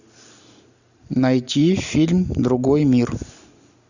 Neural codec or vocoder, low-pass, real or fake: none; 7.2 kHz; real